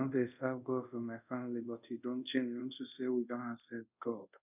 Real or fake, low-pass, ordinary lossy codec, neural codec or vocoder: fake; 3.6 kHz; none; codec, 24 kHz, 0.5 kbps, DualCodec